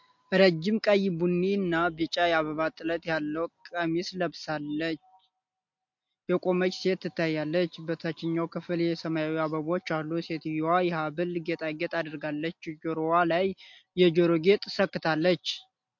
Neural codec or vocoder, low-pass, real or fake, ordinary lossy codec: none; 7.2 kHz; real; MP3, 48 kbps